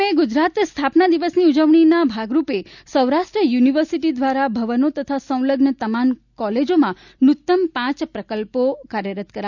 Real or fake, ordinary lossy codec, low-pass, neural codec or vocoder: real; none; 7.2 kHz; none